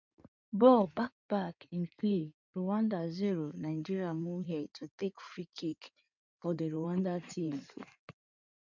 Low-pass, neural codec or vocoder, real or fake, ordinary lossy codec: 7.2 kHz; codec, 16 kHz in and 24 kHz out, 2.2 kbps, FireRedTTS-2 codec; fake; none